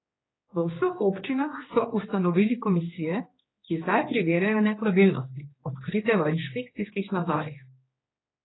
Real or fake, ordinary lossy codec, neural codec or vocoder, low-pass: fake; AAC, 16 kbps; codec, 16 kHz, 2 kbps, X-Codec, HuBERT features, trained on general audio; 7.2 kHz